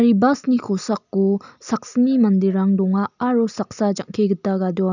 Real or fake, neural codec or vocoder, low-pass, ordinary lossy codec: real; none; 7.2 kHz; none